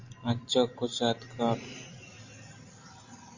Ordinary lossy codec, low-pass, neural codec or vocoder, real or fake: Opus, 64 kbps; 7.2 kHz; none; real